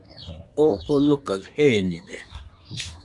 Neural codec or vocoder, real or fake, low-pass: codec, 24 kHz, 1 kbps, SNAC; fake; 10.8 kHz